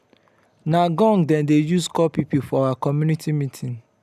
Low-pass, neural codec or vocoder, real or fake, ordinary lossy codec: 14.4 kHz; none; real; none